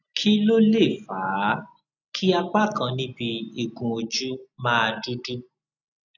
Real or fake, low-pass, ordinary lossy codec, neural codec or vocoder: real; 7.2 kHz; none; none